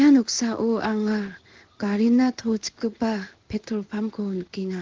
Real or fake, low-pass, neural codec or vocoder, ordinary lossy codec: fake; 7.2 kHz; codec, 16 kHz in and 24 kHz out, 1 kbps, XY-Tokenizer; Opus, 16 kbps